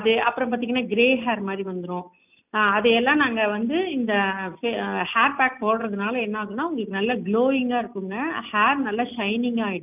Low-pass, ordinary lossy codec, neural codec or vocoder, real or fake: 3.6 kHz; none; none; real